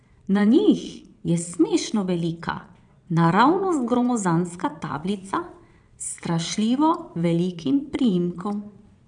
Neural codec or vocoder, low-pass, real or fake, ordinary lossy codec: vocoder, 22.05 kHz, 80 mel bands, Vocos; 9.9 kHz; fake; none